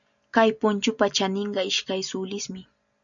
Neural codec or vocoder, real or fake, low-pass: none; real; 7.2 kHz